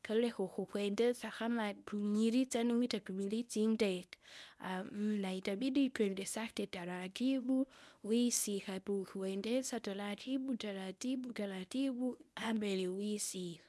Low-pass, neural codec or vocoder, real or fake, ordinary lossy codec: none; codec, 24 kHz, 0.9 kbps, WavTokenizer, medium speech release version 1; fake; none